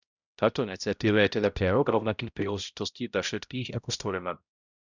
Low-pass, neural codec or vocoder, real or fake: 7.2 kHz; codec, 16 kHz, 0.5 kbps, X-Codec, HuBERT features, trained on balanced general audio; fake